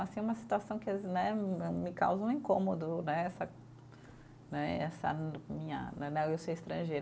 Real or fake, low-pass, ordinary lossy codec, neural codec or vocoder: real; none; none; none